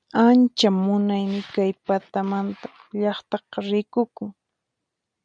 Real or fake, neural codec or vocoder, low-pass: real; none; 9.9 kHz